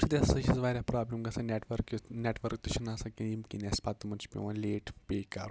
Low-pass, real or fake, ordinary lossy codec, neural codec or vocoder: none; real; none; none